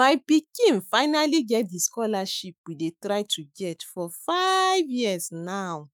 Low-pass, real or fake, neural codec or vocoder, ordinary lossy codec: none; fake; autoencoder, 48 kHz, 128 numbers a frame, DAC-VAE, trained on Japanese speech; none